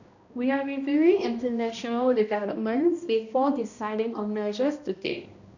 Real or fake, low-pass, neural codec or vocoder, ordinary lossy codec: fake; 7.2 kHz; codec, 16 kHz, 1 kbps, X-Codec, HuBERT features, trained on balanced general audio; none